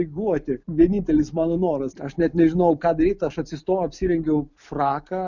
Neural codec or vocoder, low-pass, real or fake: none; 7.2 kHz; real